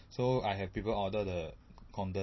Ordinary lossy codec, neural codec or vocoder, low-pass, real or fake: MP3, 24 kbps; none; 7.2 kHz; real